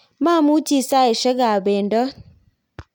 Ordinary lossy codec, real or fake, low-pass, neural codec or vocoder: none; real; 19.8 kHz; none